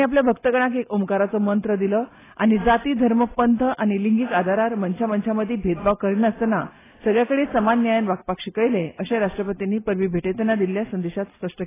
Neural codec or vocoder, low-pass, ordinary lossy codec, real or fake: none; 3.6 kHz; AAC, 16 kbps; real